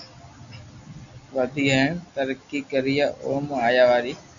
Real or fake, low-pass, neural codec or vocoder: real; 7.2 kHz; none